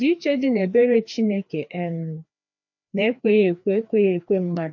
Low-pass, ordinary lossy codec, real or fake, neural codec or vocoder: 7.2 kHz; MP3, 48 kbps; fake; codec, 16 kHz, 2 kbps, FreqCodec, larger model